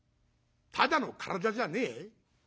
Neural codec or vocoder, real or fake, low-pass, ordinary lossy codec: none; real; none; none